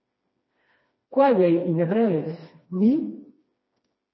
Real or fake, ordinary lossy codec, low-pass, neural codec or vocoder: fake; MP3, 24 kbps; 7.2 kHz; codec, 16 kHz, 2 kbps, FreqCodec, smaller model